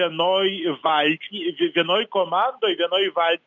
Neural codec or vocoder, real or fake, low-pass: none; real; 7.2 kHz